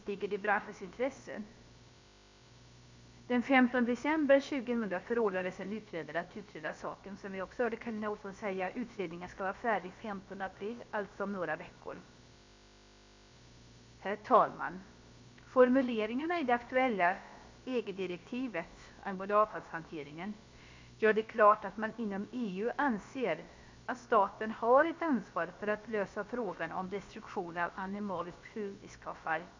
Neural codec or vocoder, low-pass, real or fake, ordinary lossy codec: codec, 16 kHz, about 1 kbps, DyCAST, with the encoder's durations; 7.2 kHz; fake; MP3, 64 kbps